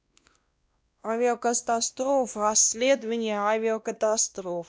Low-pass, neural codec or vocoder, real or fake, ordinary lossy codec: none; codec, 16 kHz, 1 kbps, X-Codec, WavLM features, trained on Multilingual LibriSpeech; fake; none